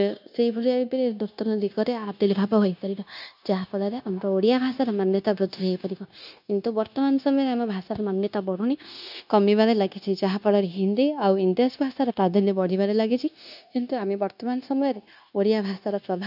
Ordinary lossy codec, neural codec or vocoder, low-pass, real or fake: none; codec, 16 kHz, 0.9 kbps, LongCat-Audio-Codec; 5.4 kHz; fake